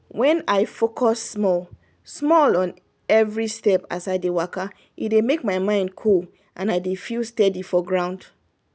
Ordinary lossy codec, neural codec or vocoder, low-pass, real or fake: none; none; none; real